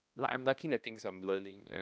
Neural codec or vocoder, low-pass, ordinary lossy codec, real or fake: codec, 16 kHz, 1 kbps, X-Codec, HuBERT features, trained on balanced general audio; none; none; fake